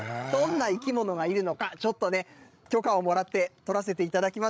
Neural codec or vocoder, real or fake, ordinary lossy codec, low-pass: codec, 16 kHz, 16 kbps, FreqCodec, larger model; fake; none; none